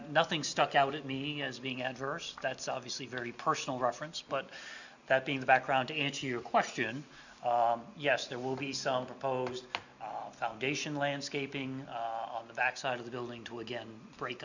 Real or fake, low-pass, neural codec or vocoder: real; 7.2 kHz; none